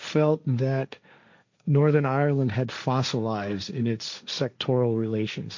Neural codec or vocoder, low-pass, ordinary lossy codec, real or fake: codec, 16 kHz, 1.1 kbps, Voila-Tokenizer; 7.2 kHz; MP3, 64 kbps; fake